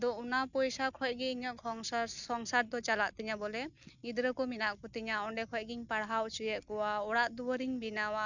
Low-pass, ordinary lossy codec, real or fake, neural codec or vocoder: 7.2 kHz; none; real; none